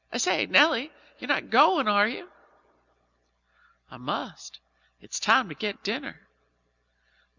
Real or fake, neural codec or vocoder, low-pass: real; none; 7.2 kHz